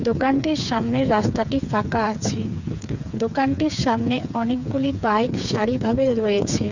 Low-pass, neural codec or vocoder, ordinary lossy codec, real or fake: 7.2 kHz; codec, 16 kHz, 4 kbps, FreqCodec, smaller model; none; fake